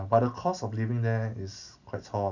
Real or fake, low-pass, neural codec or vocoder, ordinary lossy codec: real; 7.2 kHz; none; none